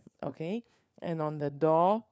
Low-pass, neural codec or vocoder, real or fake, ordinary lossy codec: none; codec, 16 kHz, 4 kbps, FunCodec, trained on LibriTTS, 50 frames a second; fake; none